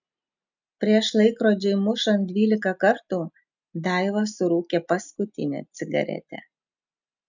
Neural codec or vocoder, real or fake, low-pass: none; real; 7.2 kHz